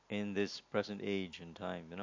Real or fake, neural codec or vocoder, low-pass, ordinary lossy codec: real; none; 7.2 kHz; MP3, 48 kbps